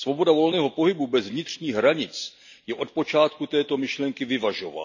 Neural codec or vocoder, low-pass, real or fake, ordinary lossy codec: none; 7.2 kHz; real; none